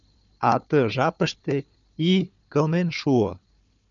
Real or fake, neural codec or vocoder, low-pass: fake; codec, 16 kHz, 4 kbps, FunCodec, trained on Chinese and English, 50 frames a second; 7.2 kHz